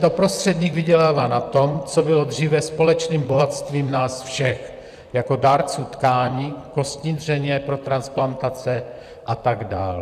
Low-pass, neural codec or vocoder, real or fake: 14.4 kHz; vocoder, 44.1 kHz, 128 mel bands, Pupu-Vocoder; fake